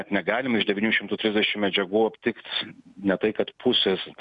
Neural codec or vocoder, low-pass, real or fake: none; 10.8 kHz; real